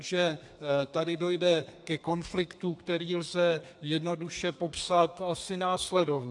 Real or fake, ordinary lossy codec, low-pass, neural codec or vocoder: fake; AAC, 64 kbps; 10.8 kHz; codec, 32 kHz, 1.9 kbps, SNAC